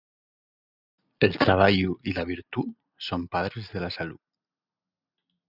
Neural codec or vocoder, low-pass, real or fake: none; 5.4 kHz; real